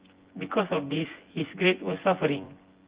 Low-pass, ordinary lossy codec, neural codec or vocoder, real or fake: 3.6 kHz; Opus, 16 kbps; vocoder, 24 kHz, 100 mel bands, Vocos; fake